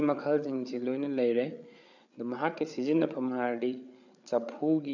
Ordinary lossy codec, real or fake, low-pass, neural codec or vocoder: none; fake; 7.2 kHz; codec, 16 kHz, 8 kbps, FreqCodec, larger model